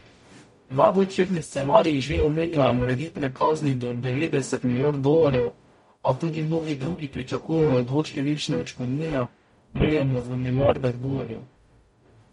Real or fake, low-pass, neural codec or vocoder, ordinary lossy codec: fake; 19.8 kHz; codec, 44.1 kHz, 0.9 kbps, DAC; MP3, 48 kbps